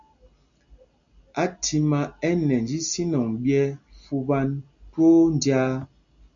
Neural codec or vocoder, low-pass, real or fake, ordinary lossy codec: none; 7.2 kHz; real; MP3, 96 kbps